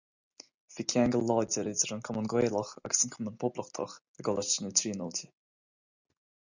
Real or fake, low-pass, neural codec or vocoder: real; 7.2 kHz; none